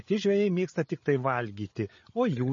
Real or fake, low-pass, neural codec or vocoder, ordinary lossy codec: fake; 7.2 kHz; codec, 16 kHz, 8 kbps, FreqCodec, larger model; MP3, 32 kbps